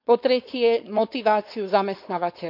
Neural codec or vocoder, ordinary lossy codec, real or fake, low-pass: codec, 16 kHz, 4.8 kbps, FACodec; none; fake; 5.4 kHz